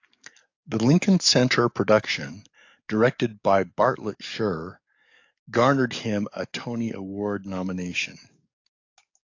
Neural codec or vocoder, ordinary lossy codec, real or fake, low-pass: codec, 44.1 kHz, 7.8 kbps, DAC; AAC, 48 kbps; fake; 7.2 kHz